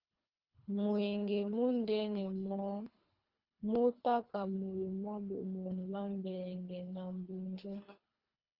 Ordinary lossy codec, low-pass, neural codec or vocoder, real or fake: Opus, 32 kbps; 5.4 kHz; codec, 24 kHz, 3 kbps, HILCodec; fake